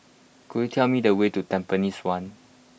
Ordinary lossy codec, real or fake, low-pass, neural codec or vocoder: none; real; none; none